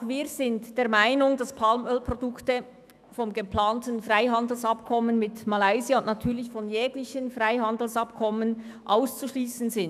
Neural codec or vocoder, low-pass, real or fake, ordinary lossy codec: autoencoder, 48 kHz, 128 numbers a frame, DAC-VAE, trained on Japanese speech; 14.4 kHz; fake; none